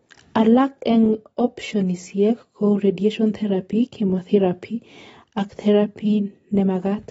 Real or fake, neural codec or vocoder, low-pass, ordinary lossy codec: real; none; 10.8 kHz; AAC, 24 kbps